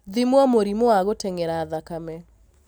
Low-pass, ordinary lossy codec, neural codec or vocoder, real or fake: none; none; none; real